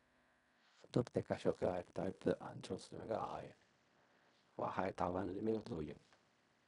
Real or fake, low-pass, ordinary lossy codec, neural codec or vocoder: fake; 10.8 kHz; none; codec, 16 kHz in and 24 kHz out, 0.4 kbps, LongCat-Audio-Codec, fine tuned four codebook decoder